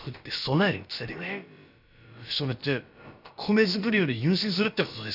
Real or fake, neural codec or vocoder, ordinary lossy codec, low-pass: fake; codec, 16 kHz, about 1 kbps, DyCAST, with the encoder's durations; none; 5.4 kHz